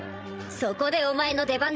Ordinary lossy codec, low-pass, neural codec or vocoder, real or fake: none; none; codec, 16 kHz, 16 kbps, FreqCodec, larger model; fake